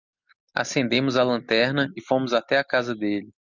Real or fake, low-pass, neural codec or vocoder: real; 7.2 kHz; none